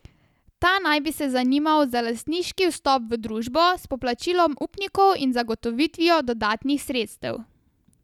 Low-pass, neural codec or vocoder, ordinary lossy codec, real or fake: 19.8 kHz; none; none; real